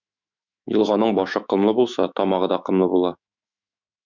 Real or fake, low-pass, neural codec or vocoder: fake; 7.2 kHz; autoencoder, 48 kHz, 128 numbers a frame, DAC-VAE, trained on Japanese speech